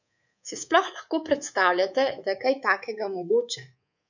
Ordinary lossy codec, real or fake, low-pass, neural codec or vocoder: none; fake; 7.2 kHz; codec, 24 kHz, 3.1 kbps, DualCodec